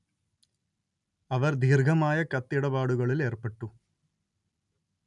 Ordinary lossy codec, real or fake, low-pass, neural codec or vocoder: none; real; 10.8 kHz; none